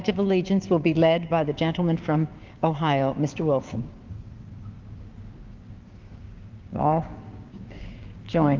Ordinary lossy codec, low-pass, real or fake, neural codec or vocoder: Opus, 32 kbps; 7.2 kHz; fake; codec, 16 kHz, 6 kbps, DAC